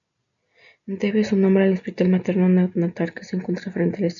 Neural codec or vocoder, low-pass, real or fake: none; 7.2 kHz; real